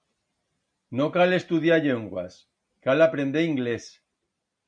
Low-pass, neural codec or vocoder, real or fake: 9.9 kHz; none; real